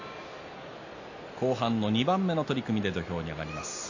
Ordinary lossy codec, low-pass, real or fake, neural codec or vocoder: AAC, 48 kbps; 7.2 kHz; real; none